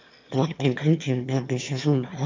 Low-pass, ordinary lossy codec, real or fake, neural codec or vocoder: 7.2 kHz; MP3, 64 kbps; fake; autoencoder, 22.05 kHz, a latent of 192 numbers a frame, VITS, trained on one speaker